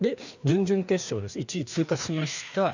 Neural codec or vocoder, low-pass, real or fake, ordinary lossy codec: codec, 16 kHz, 2 kbps, FreqCodec, larger model; 7.2 kHz; fake; none